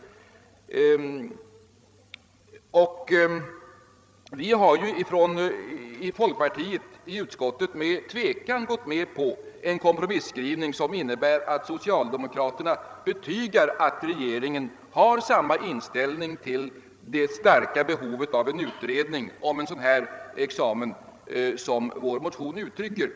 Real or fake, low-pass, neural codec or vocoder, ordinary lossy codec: fake; none; codec, 16 kHz, 16 kbps, FreqCodec, larger model; none